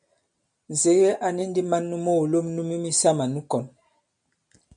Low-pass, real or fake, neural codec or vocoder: 9.9 kHz; real; none